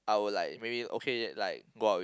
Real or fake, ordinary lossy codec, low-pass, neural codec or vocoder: real; none; none; none